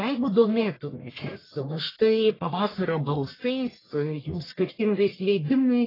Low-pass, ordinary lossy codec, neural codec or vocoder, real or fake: 5.4 kHz; AAC, 24 kbps; codec, 44.1 kHz, 1.7 kbps, Pupu-Codec; fake